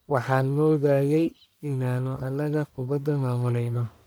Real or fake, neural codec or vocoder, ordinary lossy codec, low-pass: fake; codec, 44.1 kHz, 1.7 kbps, Pupu-Codec; none; none